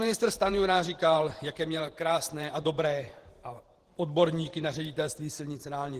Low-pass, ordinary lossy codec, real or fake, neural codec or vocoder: 14.4 kHz; Opus, 16 kbps; fake; vocoder, 48 kHz, 128 mel bands, Vocos